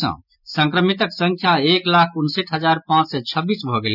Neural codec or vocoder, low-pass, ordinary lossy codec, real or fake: none; 5.4 kHz; none; real